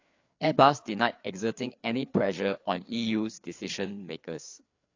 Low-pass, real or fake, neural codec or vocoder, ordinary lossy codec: 7.2 kHz; fake; codec, 16 kHz, 4 kbps, FreqCodec, larger model; AAC, 48 kbps